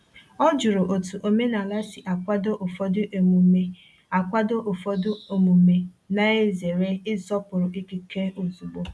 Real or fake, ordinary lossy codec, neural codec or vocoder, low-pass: real; none; none; none